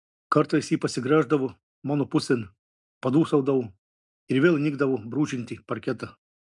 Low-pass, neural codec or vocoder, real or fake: 10.8 kHz; none; real